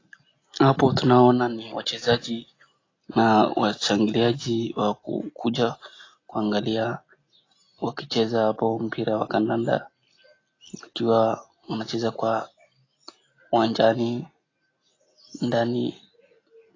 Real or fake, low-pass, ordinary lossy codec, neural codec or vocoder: real; 7.2 kHz; AAC, 32 kbps; none